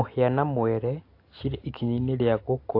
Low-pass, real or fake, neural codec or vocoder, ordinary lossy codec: 5.4 kHz; real; none; AAC, 32 kbps